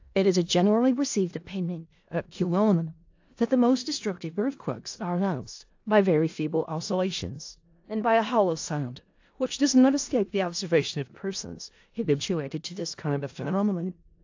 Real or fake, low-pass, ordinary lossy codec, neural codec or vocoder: fake; 7.2 kHz; AAC, 48 kbps; codec, 16 kHz in and 24 kHz out, 0.4 kbps, LongCat-Audio-Codec, four codebook decoder